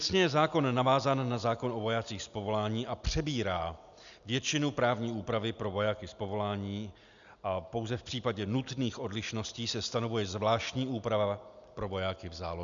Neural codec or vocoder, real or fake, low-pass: none; real; 7.2 kHz